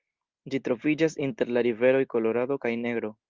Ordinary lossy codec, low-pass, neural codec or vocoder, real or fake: Opus, 32 kbps; 7.2 kHz; none; real